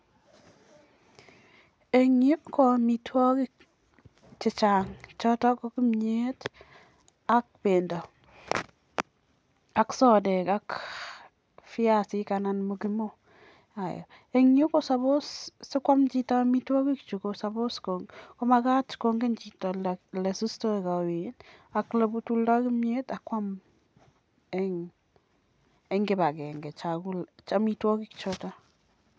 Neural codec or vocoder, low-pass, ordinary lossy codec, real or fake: none; none; none; real